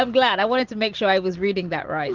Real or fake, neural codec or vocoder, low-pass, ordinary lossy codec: real; none; 7.2 kHz; Opus, 16 kbps